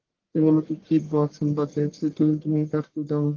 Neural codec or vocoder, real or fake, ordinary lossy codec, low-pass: codec, 44.1 kHz, 1.7 kbps, Pupu-Codec; fake; Opus, 16 kbps; 7.2 kHz